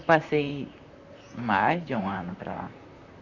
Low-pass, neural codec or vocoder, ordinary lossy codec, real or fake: 7.2 kHz; vocoder, 44.1 kHz, 128 mel bands, Pupu-Vocoder; none; fake